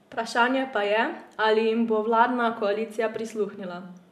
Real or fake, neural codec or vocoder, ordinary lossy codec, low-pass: real; none; MP3, 96 kbps; 14.4 kHz